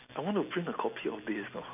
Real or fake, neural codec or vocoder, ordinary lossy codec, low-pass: real; none; none; 3.6 kHz